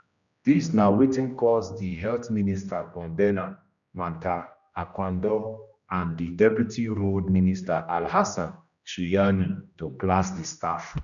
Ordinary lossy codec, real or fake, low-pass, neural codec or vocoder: none; fake; 7.2 kHz; codec, 16 kHz, 1 kbps, X-Codec, HuBERT features, trained on general audio